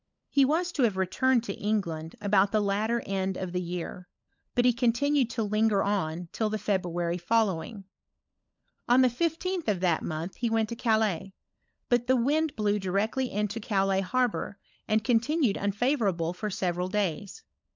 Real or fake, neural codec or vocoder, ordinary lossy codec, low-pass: fake; codec, 16 kHz, 16 kbps, FunCodec, trained on LibriTTS, 50 frames a second; MP3, 64 kbps; 7.2 kHz